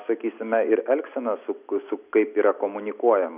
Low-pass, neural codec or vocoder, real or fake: 3.6 kHz; none; real